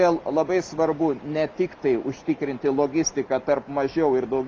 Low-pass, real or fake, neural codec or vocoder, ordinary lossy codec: 7.2 kHz; real; none; Opus, 32 kbps